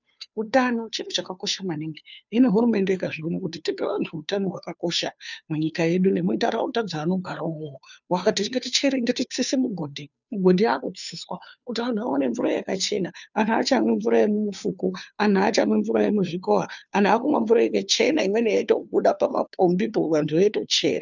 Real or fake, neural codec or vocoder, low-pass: fake; codec, 16 kHz, 2 kbps, FunCodec, trained on Chinese and English, 25 frames a second; 7.2 kHz